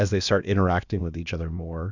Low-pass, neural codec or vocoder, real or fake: 7.2 kHz; codec, 16 kHz, about 1 kbps, DyCAST, with the encoder's durations; fake